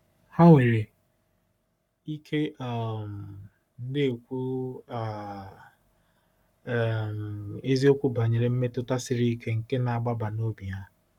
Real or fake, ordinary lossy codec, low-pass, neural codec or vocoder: fake; none; 19.8 kHz; codec, 44.1 kHz, 7.8 kbps, Pupu-Codec